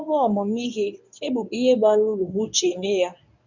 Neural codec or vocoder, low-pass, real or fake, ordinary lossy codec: codec, 24 kHz, 0.9 kbps, WavTokenizer, medium speech release version 1; 7.2 kHz; fake; none